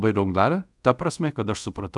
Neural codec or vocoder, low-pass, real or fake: codec, 24 kHz, 0.5 kbps, DualCodec; 10.8 kHz; fake